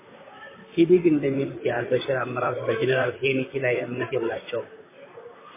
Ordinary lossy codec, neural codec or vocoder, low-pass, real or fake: MP3, 16 kbps; vocoder, 44.1 kHz, 128 mel bands, Pupu-Vocoder; 3.6 kHz; fake